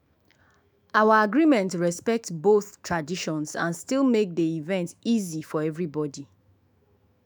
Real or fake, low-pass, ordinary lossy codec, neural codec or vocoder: fake; none; none; autoencoder, 48 kHz, 128 numbers a frame, DAC-VAE, trained on Japanese speech